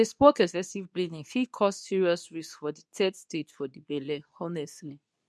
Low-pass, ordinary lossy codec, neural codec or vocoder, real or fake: none; none; codec, 24 kHz, 0.9 kbps, WavTokenizer, medium speech release version 2; fake